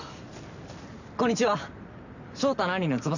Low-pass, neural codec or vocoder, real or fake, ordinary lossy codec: 7.2 kHz; none; real; none